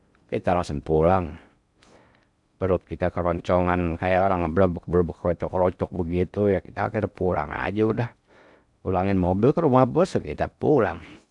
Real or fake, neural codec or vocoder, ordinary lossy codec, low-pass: fake; codec, 16 kHz in and 24 kHz out, 0.6 kbps, FocalCodec, streaming, 4096 codes; none; 10.8 kHz